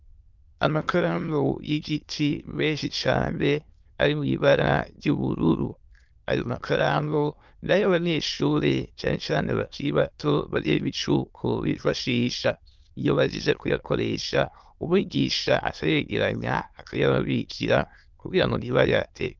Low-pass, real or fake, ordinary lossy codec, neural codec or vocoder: 7.2 kHz; fake; Opus, 32 kbps; autoencoder, 22.05 kHz, a latent of 192 numbers a frame, VITS, trained on many speakers